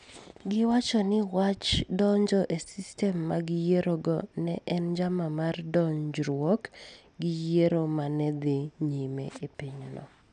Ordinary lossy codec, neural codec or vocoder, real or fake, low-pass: none; none; real; 9.9 kHz